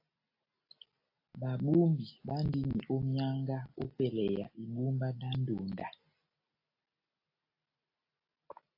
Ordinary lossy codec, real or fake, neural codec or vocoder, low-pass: AAC, 32 kbps; real; none; 5.4 kHz